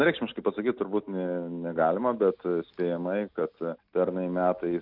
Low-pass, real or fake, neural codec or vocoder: 5.4 kHz; real; none